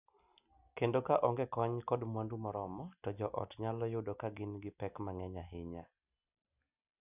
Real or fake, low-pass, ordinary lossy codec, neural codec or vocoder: real; 3.6 kHz; none; none